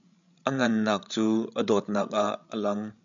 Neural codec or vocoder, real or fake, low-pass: codec, 16 kHz, 16 kbps, FreqCodec, larger model; fake; 7.2 kHz